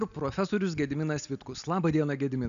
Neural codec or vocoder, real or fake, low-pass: none; real; 7.2 kHz